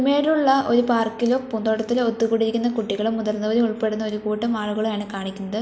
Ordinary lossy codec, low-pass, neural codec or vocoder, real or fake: none; none; none; real